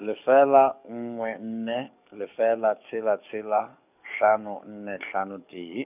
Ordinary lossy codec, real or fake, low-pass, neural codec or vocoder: none; fake; 3.6 kHz; codec, 44.1 kHz, 7.8 kbps, DAC